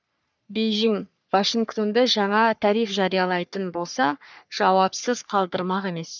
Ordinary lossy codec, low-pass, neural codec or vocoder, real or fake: none; 7.2 kHz; codec, 44.1 kHz, 3.4 kbps, Pupu-Codec; fake